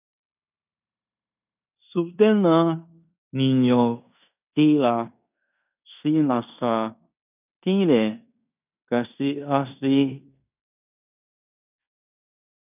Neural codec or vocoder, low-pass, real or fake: codec, 16 kHz in and 24 kHz out, 0.9 kbps, LongCat-Audio-Codec, fine tuned four codebook decoder; 3.6 kHz; fake